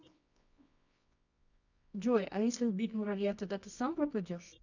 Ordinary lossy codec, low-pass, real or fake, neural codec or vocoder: none; 7.2 kHz; fake; codec, 24 kHz, 0.9 kbps, WavTokenizer, medium music audio release